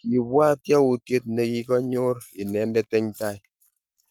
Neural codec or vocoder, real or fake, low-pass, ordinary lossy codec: codec, 44.1 kHz, 7.8 kbps, Pupu-Codec; fake; none; none